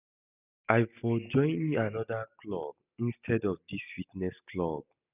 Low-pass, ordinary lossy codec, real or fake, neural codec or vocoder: 3.6 kHz; none; real; none